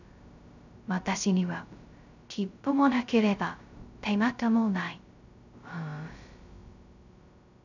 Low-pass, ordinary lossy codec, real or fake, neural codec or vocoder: 7.2 kHz; none; fake; codec, 16 kHz, 0.2 kbps, FocalCodec